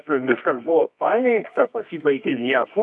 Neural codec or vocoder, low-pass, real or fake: codec, 24 kHz, 0.9 kbps, WavTokenizer, medium music audio release; 10.8 kHz; fake